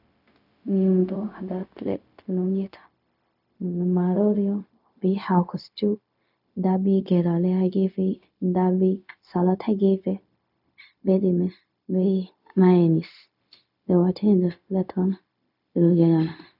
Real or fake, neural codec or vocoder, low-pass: fake; codec, 16 kHz, 0.4 kbps, LongCat-Audio-Codec; 5.4 kHz